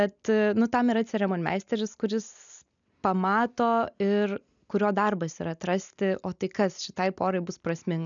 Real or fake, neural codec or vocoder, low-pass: real; none; 7.2 kHz